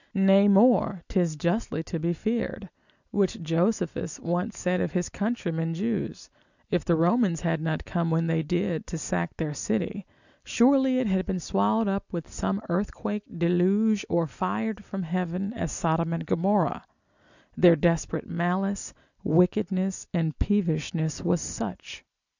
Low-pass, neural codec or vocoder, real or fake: 7.2 kHz; none; real